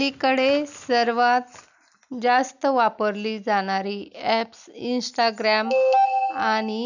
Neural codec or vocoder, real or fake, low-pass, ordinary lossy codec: none; real; 7.2 kHz; none